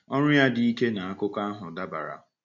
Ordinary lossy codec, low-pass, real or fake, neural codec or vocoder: none; 7.2 kHz; real; none